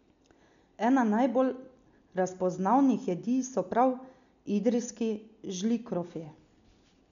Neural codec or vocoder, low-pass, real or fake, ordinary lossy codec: none; 7.2 kHz; real; MP3, 96 kbps